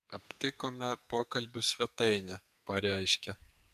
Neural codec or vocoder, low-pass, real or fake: codec, 44.1 kHz, 2.6 kbps, SNAC; 14.4 kHz; fake